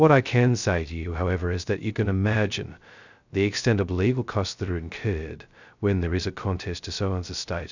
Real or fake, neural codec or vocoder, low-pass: fake; codec, 16 kHz, 0.2 kbps, FocalCodec; 7.2 kHz